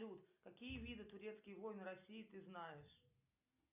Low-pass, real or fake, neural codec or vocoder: 3.6 kHz; real; none